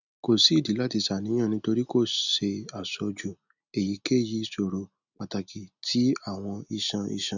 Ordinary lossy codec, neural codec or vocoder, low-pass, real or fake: none; none; 7.2 kHz; real